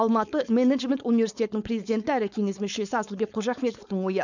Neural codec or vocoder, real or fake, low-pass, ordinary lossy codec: codec, 16 kHz, 4.8 kbps, FACodec; fake; 7.2 kHz; none